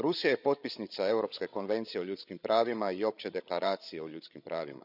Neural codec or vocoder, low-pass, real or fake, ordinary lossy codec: codec, 16 kHz, 16 kbps, FreqCodec, larger model; 5.4 kHz; fake; none